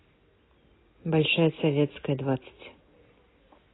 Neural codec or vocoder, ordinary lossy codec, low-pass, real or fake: none; AAC, 16 kbps; 7.2 kHz; real